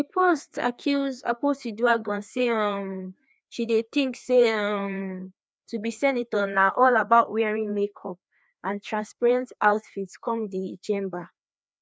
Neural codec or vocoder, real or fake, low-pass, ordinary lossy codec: codec, 16 kHz, 2 kbps, FreqCodec, larger model; fake; none; none